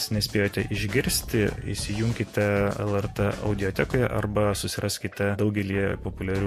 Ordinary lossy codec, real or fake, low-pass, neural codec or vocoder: MP3, 64 kbps; fake; 14.4 kHz; vocoder, 48 kHz, 128 mel bands, Vocos